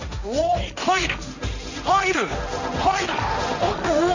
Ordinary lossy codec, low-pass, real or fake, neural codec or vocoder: none; 7.2 kHz; fake; codec, 16 kHz, 1.1 kbps, Voila-Tokenizer